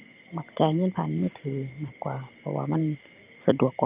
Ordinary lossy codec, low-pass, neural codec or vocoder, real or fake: Opus, 24 kbps; 3.6 kHz; vocoder, 44.1 kHz, 128 mel bands every 512 samples, BigVGAN v2; fake